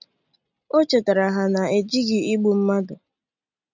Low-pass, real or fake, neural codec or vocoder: 7.2 kHz; real; none